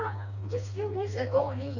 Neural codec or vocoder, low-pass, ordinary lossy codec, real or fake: codec, 16 kHz, 2 kbps, FreqCodec, smaller model; 7.2 kHz; none; fake